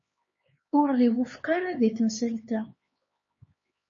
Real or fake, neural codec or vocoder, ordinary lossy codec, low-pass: fake; codec, 16 kHz, 4 kbps, X-Codec, HuBERT features, trained on LibriSpeech; MP3, 32 kbps; 7.2 kHz